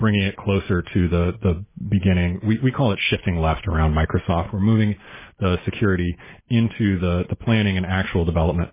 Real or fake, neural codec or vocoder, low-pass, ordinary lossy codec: real; none; 3.6 kHz; MP3, 16 kbps